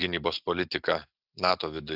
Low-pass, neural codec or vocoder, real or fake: 5.4 kHz; none; real